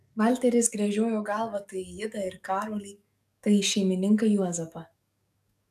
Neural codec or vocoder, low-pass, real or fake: codec, 44.1 kHz, 7.8 kbps, DAC; 14.4 kHz; fake